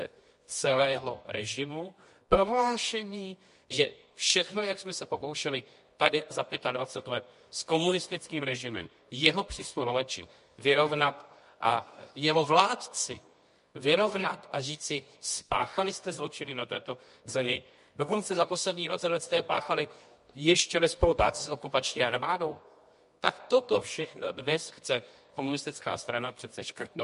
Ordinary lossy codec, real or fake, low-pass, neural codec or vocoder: MP3, 48 kbps; fake; 10.8 kHz; codec, 24 kHz, 0.9 kbps, WavTokenizer, medium music audio release